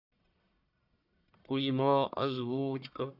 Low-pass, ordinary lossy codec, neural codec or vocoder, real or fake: 5.4 kHz; none; codec, 44.1 kHz, 1.7 kbps, Pupu-Codec; fake